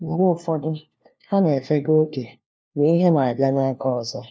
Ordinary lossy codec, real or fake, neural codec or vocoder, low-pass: none; fake; codec, 16 kHz, 1 kbps, FunCodec, trained on LibriTTS, 50 frames a second; none